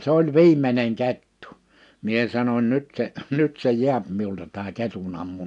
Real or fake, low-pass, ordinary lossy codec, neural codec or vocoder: real; 10.8 kHz; none; none